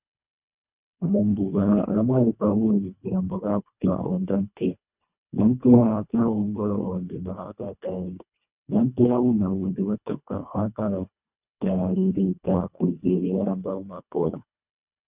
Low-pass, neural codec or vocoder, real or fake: 3.6 kHz; codec, 24 kHz, 1.5 kbps, HILCodec; fake